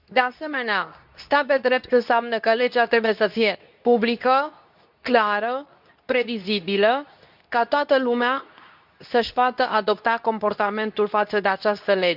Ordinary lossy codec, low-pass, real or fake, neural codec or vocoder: none; 5.4 kHz; fake; codec, 24 kHz, 0.9 kbps, WavTokenizer, medium speech release version 2